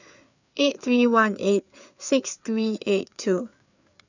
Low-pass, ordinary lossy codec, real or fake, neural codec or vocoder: 7.2 kHz; none; fake; codec, 16 kHz, 4 kbps, FreqCodec, larger model